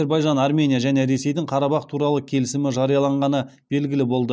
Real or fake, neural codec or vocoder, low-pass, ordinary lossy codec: real; none; none; none